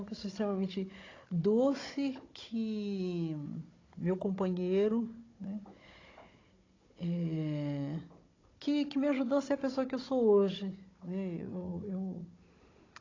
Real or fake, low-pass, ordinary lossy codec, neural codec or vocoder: fake; 7.2 kHz; AAC, 32 kbps; codec, 16 kHz, 16 kbps, FunCodec, trained on Chinese and English, 50 frames a second